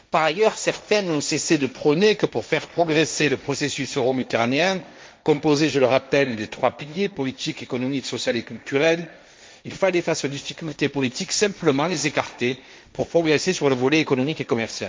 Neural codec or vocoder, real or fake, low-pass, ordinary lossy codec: codec, 16 kHz, 1.1 kbps, Voila-Tokenizer; fake; none; none